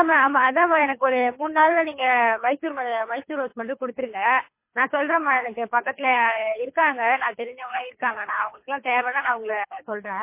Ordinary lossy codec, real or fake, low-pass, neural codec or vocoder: MP3, 24 kbps; fake; 3.6 kHz; codec, 24 kHz, 3 kbps, HILCodec